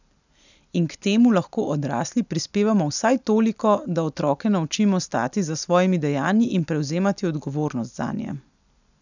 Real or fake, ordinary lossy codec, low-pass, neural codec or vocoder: real; none; 7.2 kHz; none